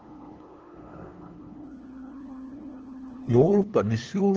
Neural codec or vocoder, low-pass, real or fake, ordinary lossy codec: codec, 16 kHz, 2 kbps, FunCodec, trained on LibriTTS, 25 frames a second; 7.2 kHz; fake; Opus, 16 kbps